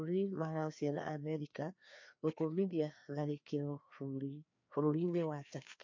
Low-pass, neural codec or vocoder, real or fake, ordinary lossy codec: 7.2 kHz; codec, 16 kHz, 2 kbps, FreqCodec, larger model; fake; MP3, 48 kbps